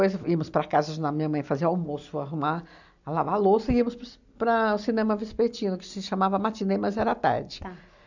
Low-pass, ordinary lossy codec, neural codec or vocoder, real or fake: 7.2 kHz; none; none; real